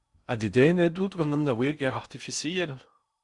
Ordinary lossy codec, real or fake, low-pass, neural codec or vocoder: Opus, 64 kbps; fake; 10.8 kHz; codec, 16 kHz in and 24 kHz out, 0.8 kbps, FocalCodec, streaming, 65536 codes